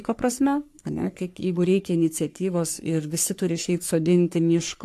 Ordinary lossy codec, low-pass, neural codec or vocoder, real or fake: AAC, 64 kbps; 14.4 kHz; codec, 44.1 kHz, 3.4 kbps, Pupu-Codec; fake